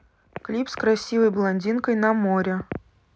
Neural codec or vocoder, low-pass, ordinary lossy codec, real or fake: none; none; none; real